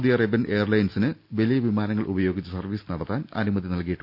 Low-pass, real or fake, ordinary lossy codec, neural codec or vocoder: 5.4 kHz; real; none; none